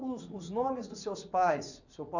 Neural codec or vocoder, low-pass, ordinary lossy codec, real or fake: vocoder, 44.1 kHz, 80 mel bands, Vocos; 7.2 kHz; none; fake